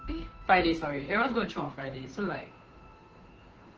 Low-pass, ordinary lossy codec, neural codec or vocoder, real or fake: 7.2 kHz; Opus, 16 kbps; codec, 16 kHz, 2 kbps, FunCodec, trained on Chinese and English, 25 frames a second; fake